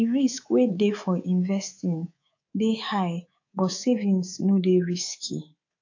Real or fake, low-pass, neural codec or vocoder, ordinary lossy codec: fake; 7.2 kHz; codec, 24 kHz, 3.1 kbps, DualCodec; AAC, 48 kbps